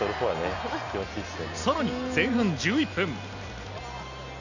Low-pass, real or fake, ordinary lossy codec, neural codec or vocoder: 7.2 kHz; real; none; none